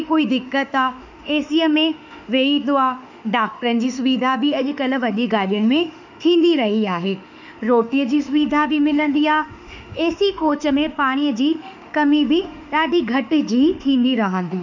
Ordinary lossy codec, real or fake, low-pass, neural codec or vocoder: none; fake; 7.2 kHz; autoencoder, 48 kHz, 32 numbers a frame, DAC-VAE, trained on Japanese speech